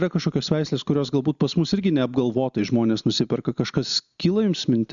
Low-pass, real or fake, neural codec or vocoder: 7.2 kHz; real; none